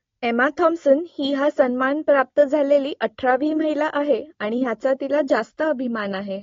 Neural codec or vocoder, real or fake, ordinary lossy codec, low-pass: none; real; AAC, 32 kbps; 7.2 kHz